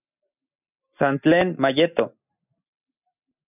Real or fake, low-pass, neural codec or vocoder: real; 3.6 kHz; none